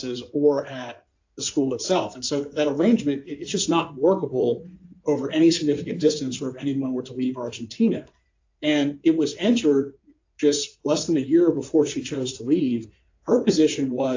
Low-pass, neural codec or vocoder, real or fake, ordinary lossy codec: 7.2 kHz; codec, 16 kHz in and 24 kHz out, 2.2 kbps, FireRedTTS-2 codec; fake; AAC, 48 kbps